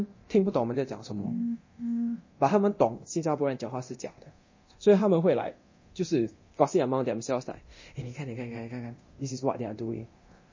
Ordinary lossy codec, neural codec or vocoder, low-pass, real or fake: MP3, 32 kbps; codec, 24 kHz, 0.9 kbps, DualCodec; 7.2 kHz; fake